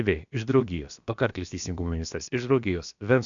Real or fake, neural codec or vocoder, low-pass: fake; codec, 16 kHz, about 1 kbps, DyCAST, with the encoder's durations; 7.2 kHz